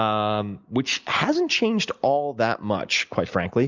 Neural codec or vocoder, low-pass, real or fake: none; 7.2 kHz; real